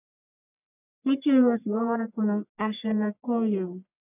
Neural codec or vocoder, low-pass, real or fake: codec, 44.1 kHz, 1.7 kbps, Pupu-Codec; 3.6 kHz; fake